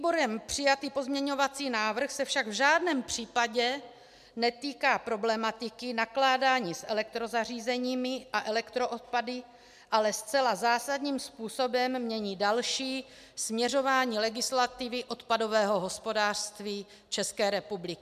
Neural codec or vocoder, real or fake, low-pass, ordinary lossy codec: none; real; 14.4 kHz; MP3, 96 kbps